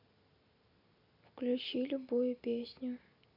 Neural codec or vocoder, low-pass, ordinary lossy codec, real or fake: none; 5.4 kHz; none; real